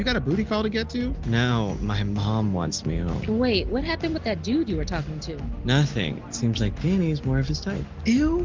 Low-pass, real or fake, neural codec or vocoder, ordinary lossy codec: 7.2 kHz; real; none; Opus, 32 kbps